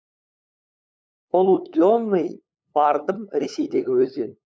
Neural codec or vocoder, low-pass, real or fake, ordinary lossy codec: codec, 16 kHz, 8 kbps, FunCodec, trained on LibriTTS, 25 frames a second; none; fake; none